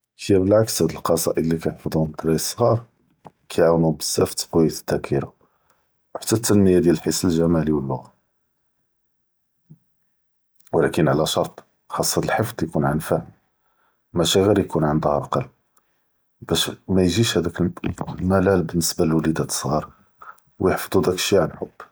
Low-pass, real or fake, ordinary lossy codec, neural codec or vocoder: none; real; none; none